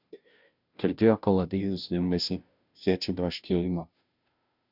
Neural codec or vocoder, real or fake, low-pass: codec, 16 kHz, 0.5 kbps, FunCodec, trained on Chinese and English, 25 frames a second; fake; 5.4 kHz